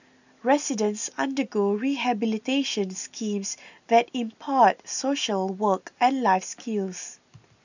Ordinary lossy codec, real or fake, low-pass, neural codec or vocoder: none; real; 7.2 kHz; none